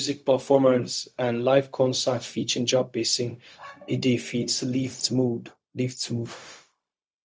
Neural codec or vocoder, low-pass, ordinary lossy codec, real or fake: codec, 16 kHz, 0.4 kbps, LongCat-Audio-Codec; none; none; fake